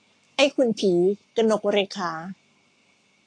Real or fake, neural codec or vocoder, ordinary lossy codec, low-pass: fake; codec, 44.1 kHz, 7.8 kbps, Pupu-Codec; AAC, 48 kbps; 9.9 kHz